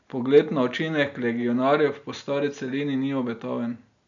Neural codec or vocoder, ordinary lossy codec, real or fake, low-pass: none; none; real; 7.2 kHz